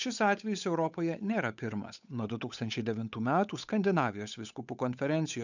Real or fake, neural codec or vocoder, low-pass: fake; codec, 16 kHz, 8 kbps, FunCodec, trained on Chinese and English, 25 frames a second; 7.2 kHz